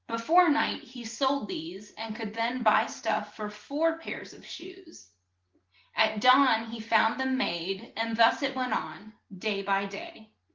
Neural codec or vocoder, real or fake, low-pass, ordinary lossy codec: none; real; 7.2 kHz; Opus, 16 kbps